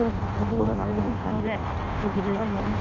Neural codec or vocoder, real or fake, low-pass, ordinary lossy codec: codec, 16 kHz in and 24 kHz out, 0.6 kbps, FireRedTTS-2 codec; fake; 7.2 kHz; none